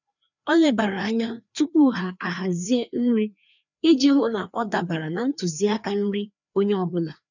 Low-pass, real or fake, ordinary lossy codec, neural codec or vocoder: 7.2 kHz; fake; none; codec, 16 kHz, 2 kbps, FreqCodec, larger model